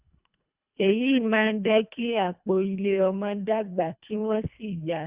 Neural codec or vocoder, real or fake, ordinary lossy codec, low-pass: codec, 24 kHz, 1.5 kbps, HILCodec; fake; Opus, 64 kbps; 3.6 kHz